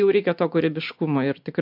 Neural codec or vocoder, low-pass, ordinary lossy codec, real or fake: none; 5.4 kHz; MP3, 48 kbps; real